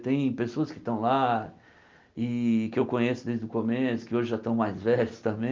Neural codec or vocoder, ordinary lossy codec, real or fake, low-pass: none; Opus, 24 kbps; real; 7.2 kHz